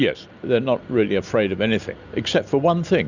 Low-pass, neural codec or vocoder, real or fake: 7.2 kHz; none; real